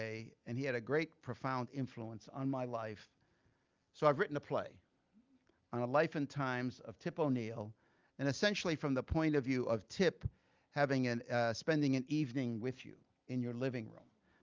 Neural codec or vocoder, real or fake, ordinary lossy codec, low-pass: none; real; Opus, 32 kbps; 7.2 kHz